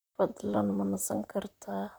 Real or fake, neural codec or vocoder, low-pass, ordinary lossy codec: fake; vocoder, 44.1 kHz, 128 mel bands, Pupu-Vocoder; none; none